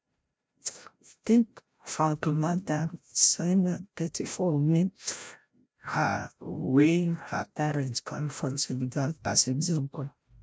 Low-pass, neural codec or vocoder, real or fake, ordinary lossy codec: none; codec, 16 kHz, 0.5 kbps, FreqCodec, larger model; fake; none